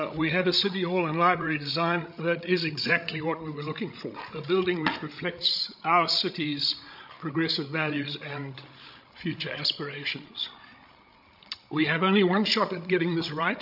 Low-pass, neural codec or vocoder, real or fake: 5.4 kHz; codec, 16 kHz, 16 kbps, FreqCodec, larger model; fake